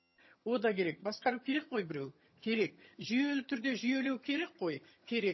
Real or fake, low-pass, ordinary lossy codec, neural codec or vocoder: fake; 7.2 kHz; MP3, 24 kbps; vocoder, 22.05 kHz, 80 mel bands, HiFi-GAN